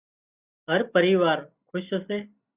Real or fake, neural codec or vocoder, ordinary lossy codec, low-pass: real; none; Opus, 64 kbps; 3.6 kHz